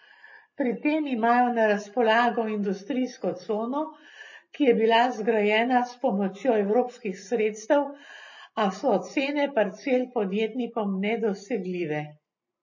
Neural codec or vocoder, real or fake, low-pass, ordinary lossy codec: none; real; 7.2 kHz; MP3, 32 kbps